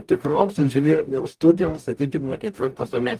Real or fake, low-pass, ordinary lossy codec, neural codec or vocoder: fake; 14.4 kHz; Opus, 32 kbps; codec, 44.1 kHz, 0.9 kbps, DAC